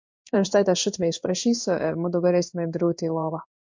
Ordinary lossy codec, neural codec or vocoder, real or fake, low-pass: MP3, 48 kbps; codec, 16 kHz in and 24 kHz out, 1 kbps, XY-Tokenizer; fake; 7.2 kHz